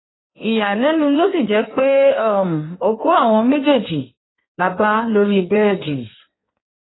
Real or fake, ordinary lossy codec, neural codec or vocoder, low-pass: fake; AAC, 16 kbps; codec, 16 kHz in and 24 kHz out, 1.1 kbps, FireRedTTS-2 codec; 7.2 kHz